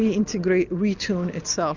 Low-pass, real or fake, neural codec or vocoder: 7.2 kHz; real; none